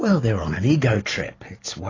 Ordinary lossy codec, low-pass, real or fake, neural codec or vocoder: AAC, 32 kbps; 7.2 kHz; real; none